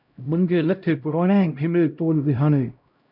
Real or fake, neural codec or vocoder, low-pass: fake; codec, 16 kHz, 0.5 kbps, X-Codec, HuBERT features, trained on LibriSpeech; 5.4 kHz